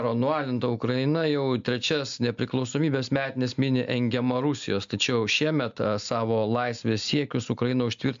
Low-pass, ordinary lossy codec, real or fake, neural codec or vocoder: 7.2 kHz; MP3, 64 kbps; real; none